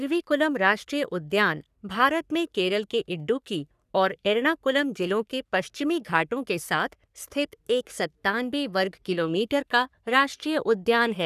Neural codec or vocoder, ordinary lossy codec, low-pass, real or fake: codec, 44.1 kHz, 3.4 kbps, Pupu-Codec; none; 14.4 kHz; fake